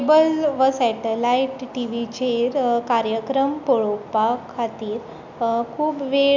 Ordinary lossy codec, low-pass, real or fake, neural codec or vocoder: none; 7.2 kHz; real; none